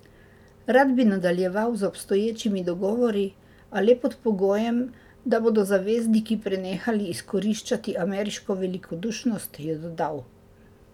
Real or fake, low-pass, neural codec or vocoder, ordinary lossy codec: real; 19.8 kHz; none; none